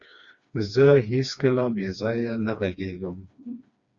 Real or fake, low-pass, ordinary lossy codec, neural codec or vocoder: fake; 7.2 kHz; Opus, 64 kbps; codec, 16 kHz, 2 kbps, FreqCodec, smaller model